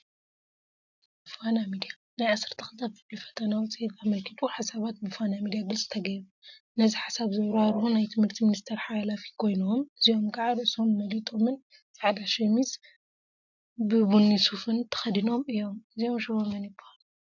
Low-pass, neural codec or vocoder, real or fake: 7.2 kHz; none; real